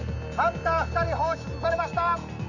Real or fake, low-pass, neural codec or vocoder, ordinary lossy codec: real; 7.2 kHz; none; none